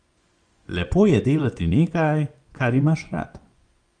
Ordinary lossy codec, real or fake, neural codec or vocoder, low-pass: Opus, 32 kbps; fake; vocoder, 24 kHz, 100 mel bands, Vocos; 9.9 kHz